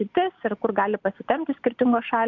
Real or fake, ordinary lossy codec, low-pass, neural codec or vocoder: real; MP3, 64 kbps; 7.2 kHz; none